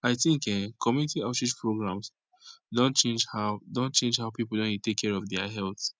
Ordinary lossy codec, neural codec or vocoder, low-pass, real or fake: none; none; none; real